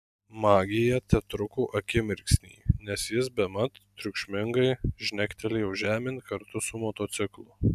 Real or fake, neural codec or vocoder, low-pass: real; none; 14.4 kHz